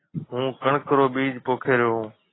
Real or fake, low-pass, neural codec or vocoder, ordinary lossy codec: real; 7.2 kHz; none; AAC, 16 kbps